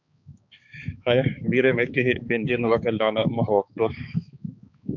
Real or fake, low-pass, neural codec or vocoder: fake; 7.2 kHz; codec, 16 kHz, 4 kbps, X-Codec, HuBERT features, trained on balanced general audio